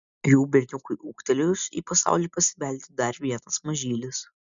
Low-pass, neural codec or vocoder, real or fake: 7.2 kHz; none; real